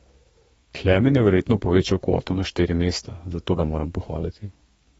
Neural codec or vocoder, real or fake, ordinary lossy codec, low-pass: codec, 32 kHz, 1.9 kbps, SNAC; fake; AAC, 24 kbps; 14.4 kHz